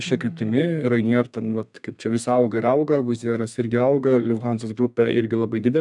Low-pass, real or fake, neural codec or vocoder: 10.8 kHz; fake; codec, 32 kHz, 1.9 kbps, SNAC